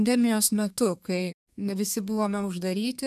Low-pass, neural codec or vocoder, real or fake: 14.4 kHz; codec, 32 kHz, 1.9 kbps, SNAC; fake